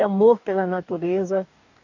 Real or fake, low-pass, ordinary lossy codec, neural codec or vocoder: fake; 7.2 kHz; none; codec, 44.1 kHz, 2.6 kbps, DAC